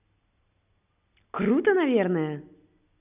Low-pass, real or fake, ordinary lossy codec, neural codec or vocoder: 3.6 kHz; real; none; none